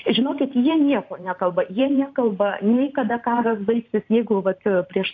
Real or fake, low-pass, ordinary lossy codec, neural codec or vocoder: fake; 7.2 kHz; AAC, 48 kbps; vocoder, 44.1 kHz, 128 mel bands every 512 samples, BigVGAN v2